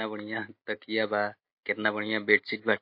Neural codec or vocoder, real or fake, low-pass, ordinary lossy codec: none; real; 5.4 kHz; MP3, 32 kbps